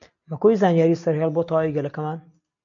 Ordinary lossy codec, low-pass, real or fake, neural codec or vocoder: MP3, 48 kbps; 7.2 kHz; real; none